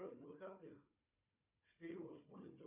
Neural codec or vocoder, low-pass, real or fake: codec, 16 kHz, 4 kbps, FunCodec, trained on Chinese and English, 50 frames a second; 3.6 kHz; fake